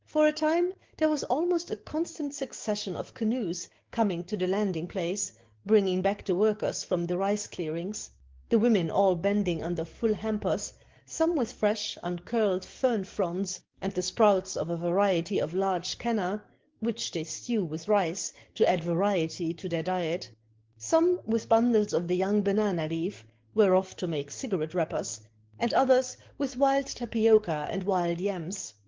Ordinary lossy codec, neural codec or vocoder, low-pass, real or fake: Opus, 16 kbps; codec, 44.1 kHz, 7.8 kbps, DAC; 7.2 kHz; fake